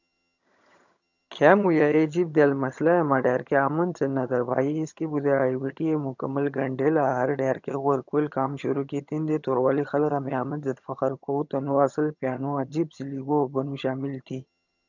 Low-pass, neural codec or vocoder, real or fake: 7.2 kHz; vocoder, 22.05 kHz, 80 mel bands, HiFi-GAN; fake